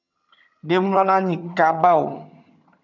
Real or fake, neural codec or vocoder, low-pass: fake; vocoder, 22.05 kHz, 80 mel bands, HiFi-GAN; 7.2 kHz